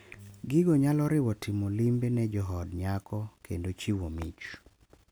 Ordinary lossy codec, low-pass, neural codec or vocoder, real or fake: none; none; none; real